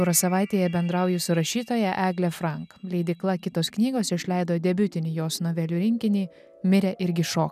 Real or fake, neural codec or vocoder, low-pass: real; none; 14.4 kHz